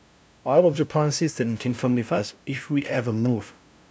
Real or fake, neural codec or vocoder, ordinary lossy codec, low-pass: fake; codec, 16 kHz, 0.5 kbps, FunCodec, trained on LibriTTS, 25 frames a second; none; none